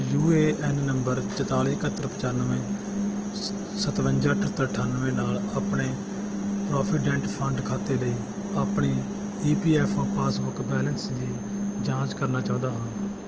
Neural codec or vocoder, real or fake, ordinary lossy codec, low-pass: none; real; Opus, 16 kbps; 7.2 kHz